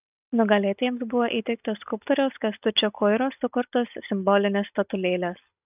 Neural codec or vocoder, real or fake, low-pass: none; real; 3.6 kHz